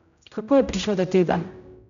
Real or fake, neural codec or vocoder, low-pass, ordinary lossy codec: fake; codec, 16 kHz, 0.5 kbps, X-Codec, HuBERT features, trained on general audio; 7.2 kHz; none